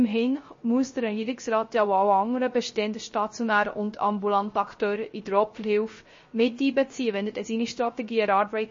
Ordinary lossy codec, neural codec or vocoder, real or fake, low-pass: MP3, 32 kbps; codec, 16 kHz, 0.3 kbps, FocalCodec; fake; 7.2 kHz